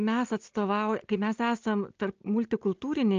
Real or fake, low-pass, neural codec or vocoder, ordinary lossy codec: real; 7.2 kHz; none; Opus, 24 kbps